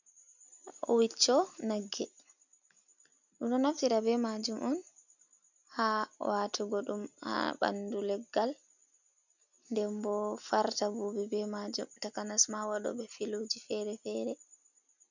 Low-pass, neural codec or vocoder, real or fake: 7.2 kHz; none; real